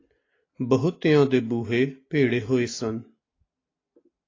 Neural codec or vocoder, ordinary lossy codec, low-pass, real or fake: none; AAC, 32 kbps; 7.2 kHz; real